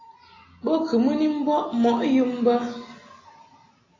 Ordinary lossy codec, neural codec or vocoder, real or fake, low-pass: AAC, 32 kbps; none; real; 7.2 kHz